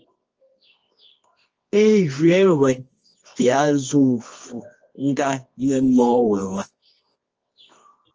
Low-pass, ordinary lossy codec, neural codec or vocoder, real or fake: 7.2 kHz; Opus, 24 kbps; codec, 24 kHz, 0.9 kbps, WavTokenizer, medium music audio release; fake